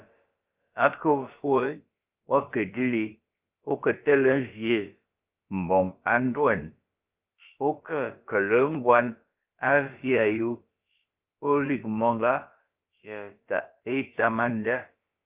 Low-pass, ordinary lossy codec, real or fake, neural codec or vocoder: 3.6 kHz; Opus, 24 kbps; fake; codec, 16 kHz, about 1 kbps, DyCAST, with the encoder's durations